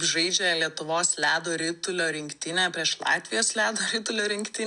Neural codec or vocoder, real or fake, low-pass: none; real; 10.8 kHz